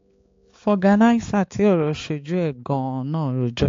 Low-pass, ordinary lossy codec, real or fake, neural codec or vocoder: 7.2 kHz; AAC, 48 kbps; fake; codec, 16 kHz, 4 kbps, X-Codec, HuBERT features, trained on balanced general audio